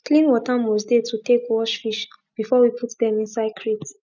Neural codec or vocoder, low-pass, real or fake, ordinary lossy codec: none; 7.2 kHz; real; none